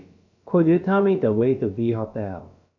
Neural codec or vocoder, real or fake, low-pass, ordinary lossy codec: codec, 16 kHz, about 1 kbps, DyCAST, with the encoder's durations; fake; 7.2 kHz; none